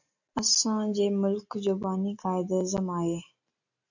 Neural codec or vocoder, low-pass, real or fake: none; 7.2 kHz; real